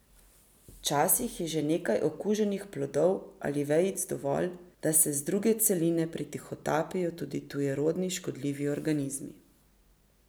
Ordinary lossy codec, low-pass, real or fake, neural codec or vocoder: none; none; real; none